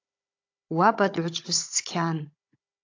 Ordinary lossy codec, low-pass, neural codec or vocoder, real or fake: AAC, 48 kbps; 7.2 kHz; codec, 16 kHz, 16 kbps, FunCodec, trained on Chinese and English, 50 frames a second; fake